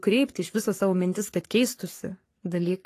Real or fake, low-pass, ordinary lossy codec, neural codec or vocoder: fake; 14.4 kHz; AAC, 48 kbps; codec, 44.1 kHz, 3.4 kbps, Pupu-Codec